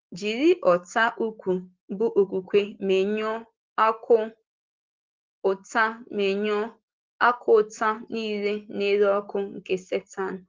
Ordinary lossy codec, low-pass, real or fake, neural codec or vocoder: Opus, 16 kbps; 7.2 kHz; real; none